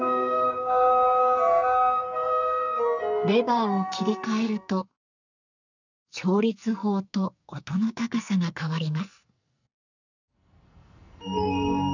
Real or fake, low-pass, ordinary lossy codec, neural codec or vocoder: fake; 7.2 kHz; none; codec, 44.1 kHz, 2.6 kbps, SNAC